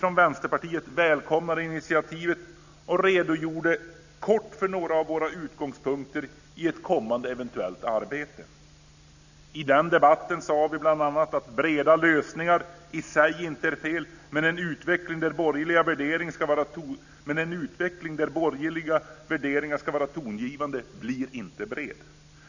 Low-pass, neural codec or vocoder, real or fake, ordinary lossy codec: 7.2 kHz; none; real; none